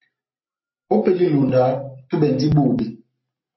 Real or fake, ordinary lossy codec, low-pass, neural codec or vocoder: real; MP3, 24 kbps; 7.2 kHz; none